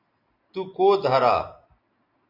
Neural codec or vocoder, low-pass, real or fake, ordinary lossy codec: none; 5.4 kHz; real; MP3, 48 kbps